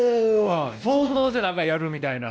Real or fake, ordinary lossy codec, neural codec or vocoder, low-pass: fake; none; codec, 16 kHz, 1 kbps, X-Codec, WavLM features, trained on Multilingual LibriSpeech; none